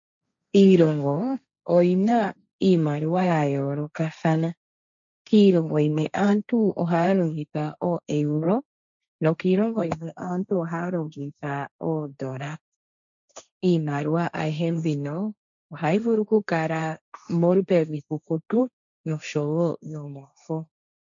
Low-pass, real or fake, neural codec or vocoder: 7.2 kHz; fake; codec, 16 kHz, 1.1 kbps, Voila-Tokenizer